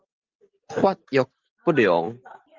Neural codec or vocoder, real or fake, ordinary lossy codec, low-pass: none; real; Opus, 32 kbps; 7.2 kHz